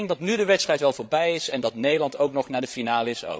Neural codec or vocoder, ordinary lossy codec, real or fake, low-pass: codec, 16 kHz, 16 kbps, FreqCodec, larger model; none; fake; none